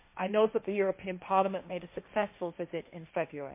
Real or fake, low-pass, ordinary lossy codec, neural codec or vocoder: fake; 3.6 kHz; MP3, 24 kbps; codec, 16 kHz, 1.1 kbps, Voila-Tokenizer